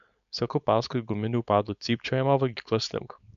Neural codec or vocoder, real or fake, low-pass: codec, 16 kHz, 4.8 kbps, FACodec; fake; 7.2 kHz